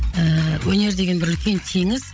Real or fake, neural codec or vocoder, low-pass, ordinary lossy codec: fake; codec, 16 kHz, 16 kbps, FreqCodec, larger model; none; none